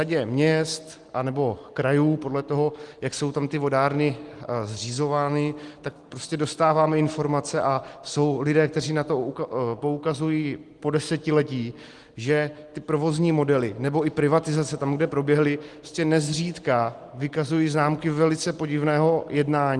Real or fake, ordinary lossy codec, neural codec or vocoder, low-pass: real; Opus, 24 kbps; none; 10.8 kHz